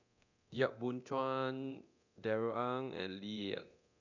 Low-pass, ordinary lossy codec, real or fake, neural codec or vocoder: 7.2 kHz; none; fake; codec, 24 kHz, 0.9 kbps, DualCodec